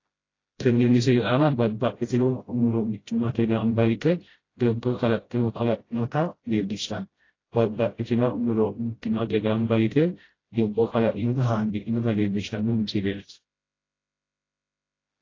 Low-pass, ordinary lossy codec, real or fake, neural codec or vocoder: 7.2 kHz; AAC, 32 kbps; fake; codec, 16 kHz, 0.5 kbps, FreqCodec, smaller model